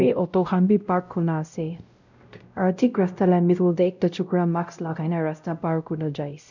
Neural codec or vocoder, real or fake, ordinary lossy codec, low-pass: codec, 16 kHz, 0.5 kbps, X-Codec, WavLM features, trained on Multilingual LibriSpeech; fake; none; 7.2 kHz